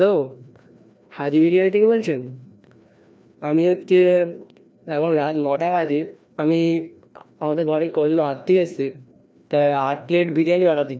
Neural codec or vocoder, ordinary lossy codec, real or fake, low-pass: codec, 16 kHz, 1 kbps, FreqCodec, larger model; none; fake; none